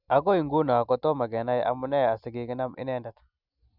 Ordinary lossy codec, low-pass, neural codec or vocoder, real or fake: none; 5.4 kHz; none; real